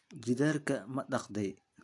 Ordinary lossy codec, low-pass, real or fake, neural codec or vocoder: AAC, 48 kbps; 10.8 kHz; real; none